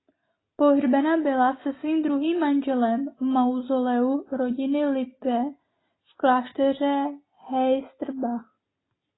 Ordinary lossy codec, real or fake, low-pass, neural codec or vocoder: AAC, 16 kbps; real; 7.2 kHz; none